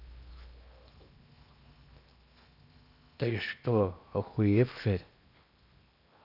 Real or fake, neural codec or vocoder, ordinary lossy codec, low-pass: fake; codec, 16 kHz in and 24 kHz out, 0.8 kbps, FocalCodec, streaming, 65536 codes; none; 5.4 kHz